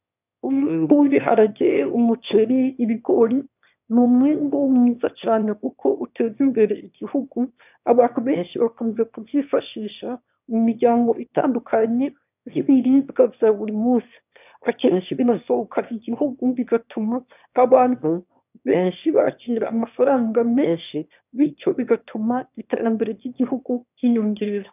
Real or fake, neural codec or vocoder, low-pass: fake; autoencoder, 22.05 kHz, a latent of 192 numbers a frame, VITS, trained on one speaker; 3.6 kHz